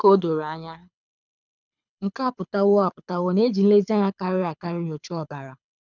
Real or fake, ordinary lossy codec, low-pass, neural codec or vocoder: fake; none; 7.2 kHz; codec, 24 kHz, 6 kbps, HILCodec